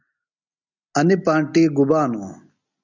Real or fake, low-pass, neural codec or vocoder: real; 7.2 kHz; none